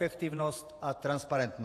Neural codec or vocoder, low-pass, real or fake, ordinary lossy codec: vocoder, 48 kHz, 128 mel bands, Vocos; 14.4 kHz; fake; AAC, 64 kbps